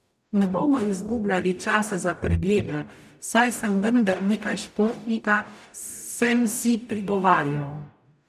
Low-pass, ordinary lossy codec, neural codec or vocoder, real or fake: 14.4 kHz; none; codec, 44.1 kHz, 0.9 kbps, DAC; fake